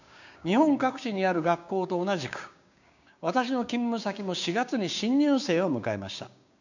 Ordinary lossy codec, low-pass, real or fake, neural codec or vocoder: none; 7.2 kHz; fake; codec, 16 kHz, 6 kbps, DAC